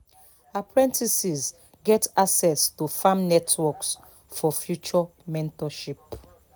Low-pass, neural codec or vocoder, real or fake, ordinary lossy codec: none; none; real; none